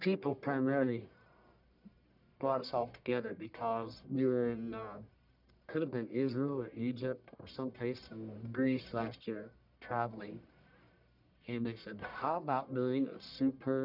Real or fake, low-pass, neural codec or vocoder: fake; 5.4 kHz; codec, 44.1 kHz, 1.7 kbps, Pupu-Codec